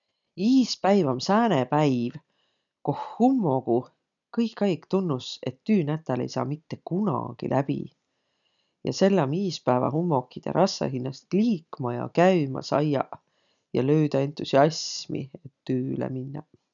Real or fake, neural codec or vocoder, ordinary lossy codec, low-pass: real; none; none; 7.2 kHz